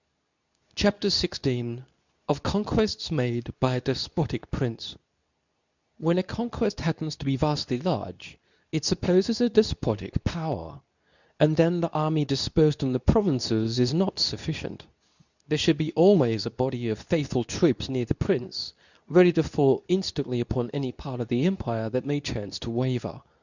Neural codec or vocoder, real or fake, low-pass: codec, 24 kHz, 0.9 kbps, WavTokenizer, medium speech release version 2; fake; 7.2 kHz